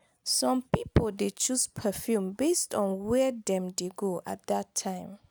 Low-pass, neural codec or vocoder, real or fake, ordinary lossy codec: none; none; real; none